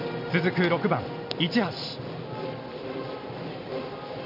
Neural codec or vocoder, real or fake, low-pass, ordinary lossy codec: none; real; 5.4 kHz; none